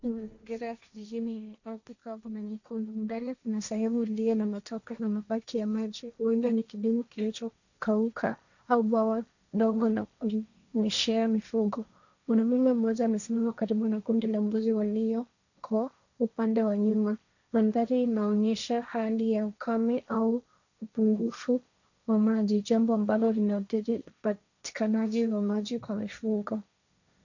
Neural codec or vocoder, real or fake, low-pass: codec, 16 kHz, 1.1 kbps, Voila-Tokenizer; fake; 7.2 kHz